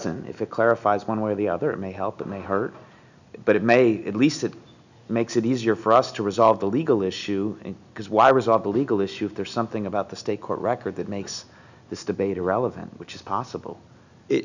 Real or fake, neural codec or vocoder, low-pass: real; none; 7.2 kHz